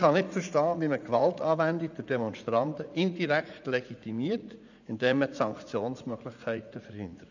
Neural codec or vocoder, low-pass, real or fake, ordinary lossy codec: vocoder, 44.1 kHz, 80 mel bands, Vocos; 7.2 kHz; fake; none